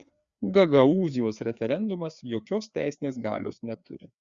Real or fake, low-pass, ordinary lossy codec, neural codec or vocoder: fake; 7.2 kHz; Opus, 64 kbps; codec, 16 kHz, 2 kbps, FreqCodec, larger model